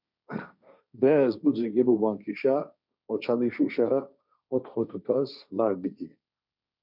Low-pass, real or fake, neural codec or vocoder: 5.4 kHz; fake; codec, 16 kHz, 1.1 kbps, Voila-Tokenizer